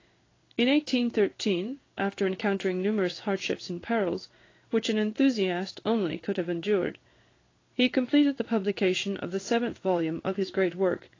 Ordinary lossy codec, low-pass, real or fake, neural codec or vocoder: AAC, 32 kbps; 7.2 kHz; fake; codec, 16 kHz in and 24 kHz out, 1 kbps, XY-Tokenizer